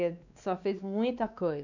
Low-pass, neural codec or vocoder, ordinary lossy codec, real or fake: 7.2 kHz; codec, 16 kHz, 2 kbps, X-Codec, WavLM features, trained on Multilingual LibriSpeech; none; fake